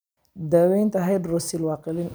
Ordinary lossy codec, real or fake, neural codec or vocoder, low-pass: none; fake; vocoder, 44.1 kHz, 128 mel bands every 256 samples, BigVGAN v2; none